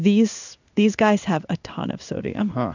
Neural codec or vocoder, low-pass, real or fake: codec, 16 kHz in and 24 kHz out, 1 kbps, XY-Tokenizer; 7.2 kHz; fake